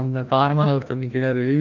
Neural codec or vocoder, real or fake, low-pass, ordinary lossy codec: codec, 16 kHz, 1 kbps, FreqCodec, larger model; fake; 7.2 kHz; none